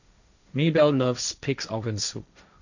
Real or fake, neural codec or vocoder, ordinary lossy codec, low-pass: fake; codec, 16 kHz, 1.1 kbps, Voila-Tokenizer; none; 7.2 kHz